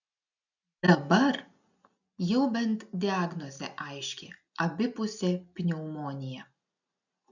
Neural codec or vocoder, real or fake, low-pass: none; real; 7.2 kHz